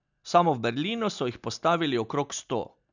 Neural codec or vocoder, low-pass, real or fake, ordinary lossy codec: none; 7.2 kHz; real; none